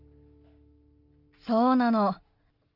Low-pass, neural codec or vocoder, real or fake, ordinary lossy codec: 5.4 kHz; none; real; Opus, 32 kbps